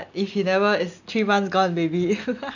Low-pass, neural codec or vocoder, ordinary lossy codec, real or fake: 7.2 kHz; none; none; real